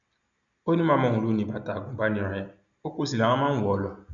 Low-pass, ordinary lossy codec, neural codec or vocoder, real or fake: 7.2 kHz; none; none; real